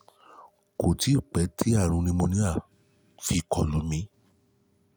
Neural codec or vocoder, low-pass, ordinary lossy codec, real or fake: none; none; none; real